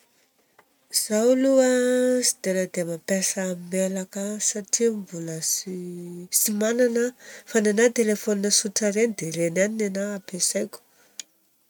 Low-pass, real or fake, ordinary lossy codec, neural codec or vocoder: 19.8 kHz; real; none; none